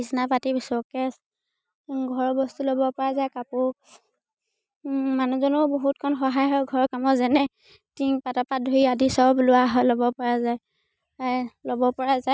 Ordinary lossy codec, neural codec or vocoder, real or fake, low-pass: none; none; real; none